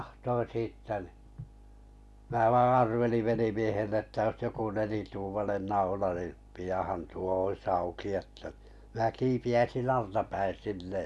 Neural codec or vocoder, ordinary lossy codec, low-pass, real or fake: none; none; none; real